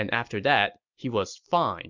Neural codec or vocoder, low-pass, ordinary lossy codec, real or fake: none; 7.2 kHz; MP3, 64 kbps; real